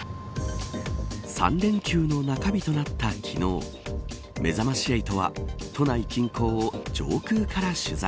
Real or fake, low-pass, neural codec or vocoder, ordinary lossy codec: real; none; none; none